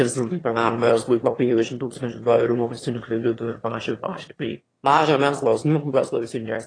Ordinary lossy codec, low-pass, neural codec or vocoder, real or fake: AAC, 32 kbps; 9.9 kHz; autoencoder, 22.05 kHz, a latent of 192 numbers a frame, VITS, trained on one speaker; fake